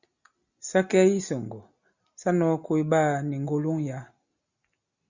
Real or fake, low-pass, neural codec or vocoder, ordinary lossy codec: real; 7.2 kHz; none; Opus, 64 kbps